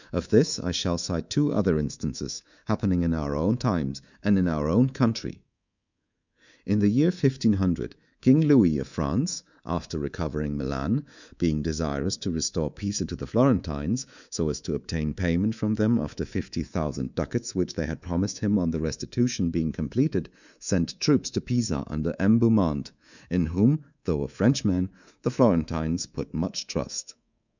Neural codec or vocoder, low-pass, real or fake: codec, 24 kHz, 3.1 kbps, DualCodec; 7.2 kHz; fake